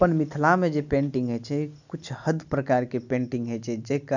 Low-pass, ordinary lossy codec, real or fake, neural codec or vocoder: 7.2 kHz; none; real; none